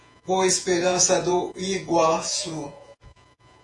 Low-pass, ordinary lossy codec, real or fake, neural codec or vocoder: 10.8 kHz; AAC, 48 kbps; fake; vocoder, 48 kHz, 128 mel bands, Vocos